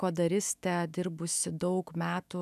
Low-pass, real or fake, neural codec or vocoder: 14.4 kHz; fake; autoencoder, 48 kHz, 128 numbers a frame, DAC-VAE, trained on Japanese speech